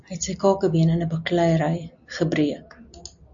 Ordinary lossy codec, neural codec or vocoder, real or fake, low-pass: AAC, 64 kbps; none; real; 7.2 kHz